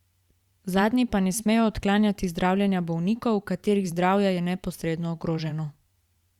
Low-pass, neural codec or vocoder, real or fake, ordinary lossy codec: 19.8 kHz; vocoder, 44.1 kHz, 128 mel bands every 512 samples, BigVGAN v2; fake; Opus, 64 kbps